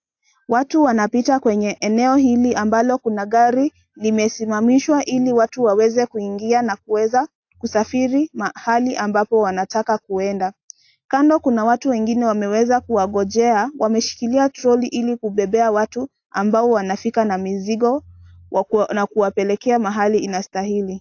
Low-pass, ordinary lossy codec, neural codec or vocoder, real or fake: 7.2 kHz; AAC, 48 kbps; none; real